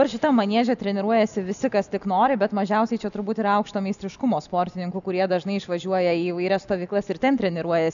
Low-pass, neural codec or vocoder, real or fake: 7.2 kHz; none; real